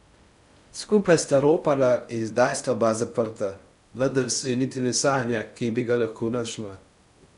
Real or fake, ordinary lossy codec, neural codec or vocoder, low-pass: fake; none; codec, 16 kHz in and 24 kHz out, 0.6 kbps, FocalCodec, streaming, 4096 codes; 10.8 kHz